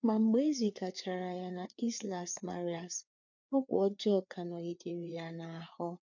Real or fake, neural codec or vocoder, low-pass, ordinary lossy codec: fake; codec, 16 kHz, 4 kbps, FreqCodec, larger model; 7.2 kHz; none